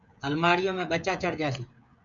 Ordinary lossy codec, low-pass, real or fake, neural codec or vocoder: MP3, 96 kbps; 7.2 kHz; fake; codec, 16 kHz, 16 kbps, FreqCodec, smaller model